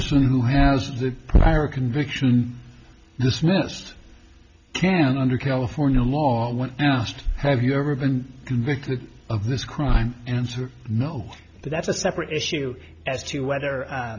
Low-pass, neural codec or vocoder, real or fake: 7.2 kHz; none; real